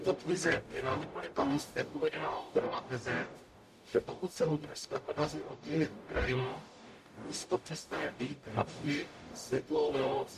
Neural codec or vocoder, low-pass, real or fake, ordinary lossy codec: codec, 44.1 kHz, 0.9 kbps, DAC; 14.4 kHz; fake; MP3, 64 kbps